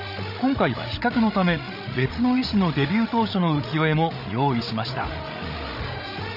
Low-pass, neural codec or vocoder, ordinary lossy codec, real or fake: 5.4 kHz; codec, 16 kHz, 16 kbps, FreqCodec, larger model; none; fake